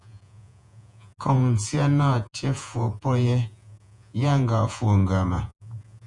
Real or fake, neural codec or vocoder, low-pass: fake; vocoder, 48 kHz, 128 mel bands, Vocos; 10.8 kHz